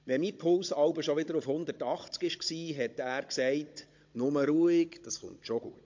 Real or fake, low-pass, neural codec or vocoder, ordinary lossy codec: fake; 7.2 kHz; codec, 16 kHz, 16 kbps, FunCodec, trained on Chinese and English, 50 frames a second; MP3, 48 kbps